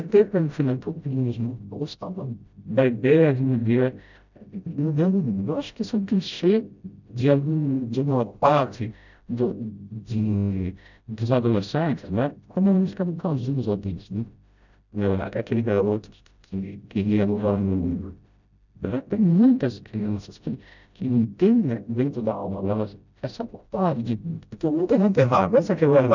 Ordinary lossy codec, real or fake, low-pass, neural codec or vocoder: none; fake; 7.2 kHz; codec, 16 kHz, 0.5 kbps, FreqCodec, smaller model